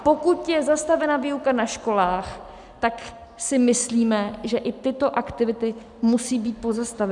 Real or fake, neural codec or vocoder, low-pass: real; none; 10.8 kHz